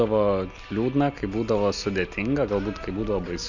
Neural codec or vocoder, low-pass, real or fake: none; 7.2 kHz; real